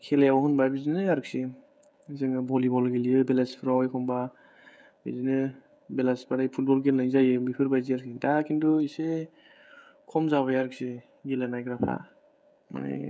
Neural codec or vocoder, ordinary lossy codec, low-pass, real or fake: codec, 16 kHz, 16 kbps, FreqCodec, smaller model; none; none; fake